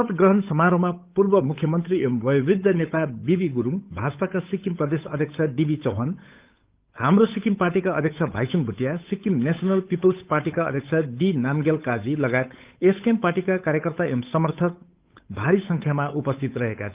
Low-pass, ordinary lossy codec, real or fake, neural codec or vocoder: 3.6 kHz; Opus, 32 kbps; fake; codec, 16 kHz, 8 kbps, FunCodec, trained on Chinese and English, 25 frames a second